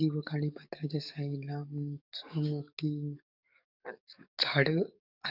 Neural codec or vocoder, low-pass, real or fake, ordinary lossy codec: codec, 44.1 kHz, 7.8 kbps, DAC; 5.4 kHz; fake; none